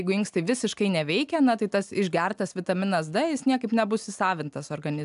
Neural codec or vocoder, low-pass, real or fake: none; 10.8 kHz; real